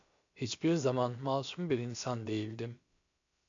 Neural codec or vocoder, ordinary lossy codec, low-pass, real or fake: codec, 16 kHz, about 1 kbps, DyCAST, with the encoder's durations; MP3, 64 kbps; 7.2 kHz; fake